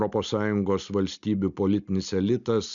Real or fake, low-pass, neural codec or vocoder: real; 7.2 kHz; none